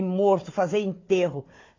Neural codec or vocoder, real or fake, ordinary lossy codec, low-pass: codec, 16 kHz, 4 kbps, FunCodec, trained on Chinese and English, 50 frames a second; fake; AAC, 32 kbps; 7.2 kHz